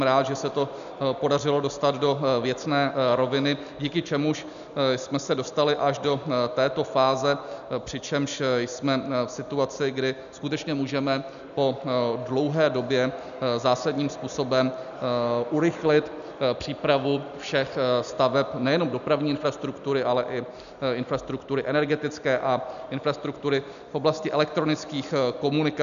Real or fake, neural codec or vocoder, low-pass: real; none; 7.2 kHz